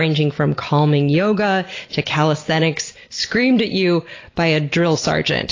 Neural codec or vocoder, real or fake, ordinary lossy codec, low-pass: none; real; AAC, 32 kbps; 7.2 kHz